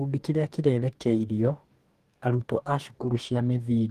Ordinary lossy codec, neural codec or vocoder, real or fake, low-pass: Opus, 24 kbps; codec, 44.1 kHz, 2.6 kbps, DAC; fake; 14.4 kHz